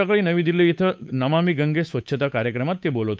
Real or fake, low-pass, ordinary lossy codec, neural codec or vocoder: fake; none; none; codec, 16 kHz, 8 kbps, FunCodec, trained on Chinese and English, 25 frames a second